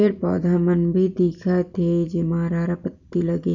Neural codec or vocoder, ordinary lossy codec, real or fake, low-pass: none; none; real; 7.2 kHz